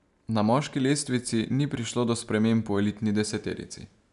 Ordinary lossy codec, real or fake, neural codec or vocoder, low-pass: none; real; none; 10.8 kHz